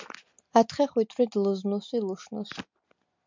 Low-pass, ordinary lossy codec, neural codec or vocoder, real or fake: 7.2 kHz; MP3, 64 kbps; none; real